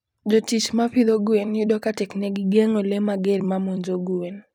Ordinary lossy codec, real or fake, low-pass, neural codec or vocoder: none; fake; 19.8 kHz; vocoder, 44.1 kHz, 128 mel bands every 256 samples, BigVGAN v2